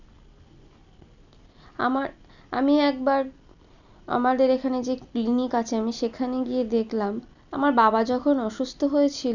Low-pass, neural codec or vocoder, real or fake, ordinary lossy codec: 7.2 kHz; none; real; none